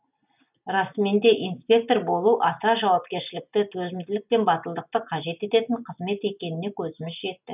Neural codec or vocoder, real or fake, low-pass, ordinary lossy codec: none; real; 3.6 kHz; none